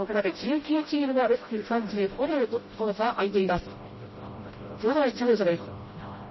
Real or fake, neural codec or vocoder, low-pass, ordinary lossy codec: fake; codec, 16 kHz, 0.5 kbps, FreqCodec, smaller model; 7.2 kHz; MP3, 24 kbps